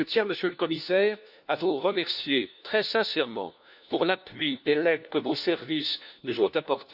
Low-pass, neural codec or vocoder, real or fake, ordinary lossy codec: 5.4 kHz; codec, 16 kHz, 1 kbps, FunCodec, trained on LibriTTS, 50 frames a second; fake; none